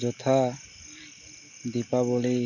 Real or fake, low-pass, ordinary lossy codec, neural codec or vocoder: real; 7.2 kHz; none; none